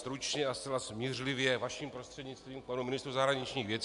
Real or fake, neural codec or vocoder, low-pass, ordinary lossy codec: real; none; 10.8 kHz; MP3, 96 kbps